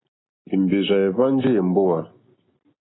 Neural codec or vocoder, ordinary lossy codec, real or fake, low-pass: none; AAC, 16 kbps; real; 7.2 kHz